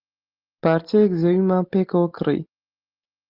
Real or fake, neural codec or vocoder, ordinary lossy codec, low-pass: real; none; Opus, 32 kbps; 5.4 kHz